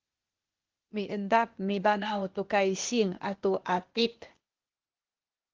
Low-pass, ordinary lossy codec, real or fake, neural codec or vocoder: 7.2 kHz; Opus, 16 kbps; fake; codec, 16 kHz, 0.8 kbps, ZipCodec